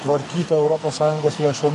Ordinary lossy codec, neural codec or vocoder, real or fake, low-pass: MP3, 48 kbps; codec, 44.1 kHz, 2.6 kbps, SNAC; fake; 14.4 kHz